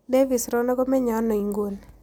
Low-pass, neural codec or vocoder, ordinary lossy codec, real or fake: none; none; none; real